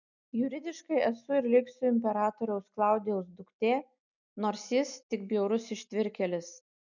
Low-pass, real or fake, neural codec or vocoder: 7.2 kHz; real; none